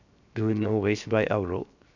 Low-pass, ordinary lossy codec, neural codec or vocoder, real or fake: 7.2 kHz; none; codec, 16 kHz, 0.7 kbps, FocalCodec; fake